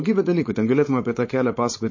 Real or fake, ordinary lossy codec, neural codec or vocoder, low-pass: fake; MP3, 32 kbps; codec, 16 kHz, 4.8 kbps, FACodec; 7.2 kHz